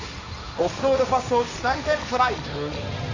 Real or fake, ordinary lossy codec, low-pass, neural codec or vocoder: fake; AAC, 48 kbps; 7.2 kHz; codec, 16 kHz, 1.1 kbps, Voila-Tokenizer